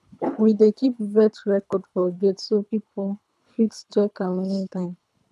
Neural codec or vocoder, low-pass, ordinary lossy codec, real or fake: codec, 24 kHz, 3 kbps, HILCodec; none; none; fake